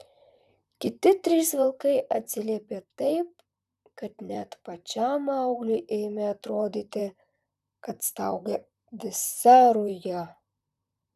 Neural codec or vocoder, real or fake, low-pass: vocoder, 44.1 kHz, 128 mel bands, Pupu-Vocoder; fake; 14.4 kHz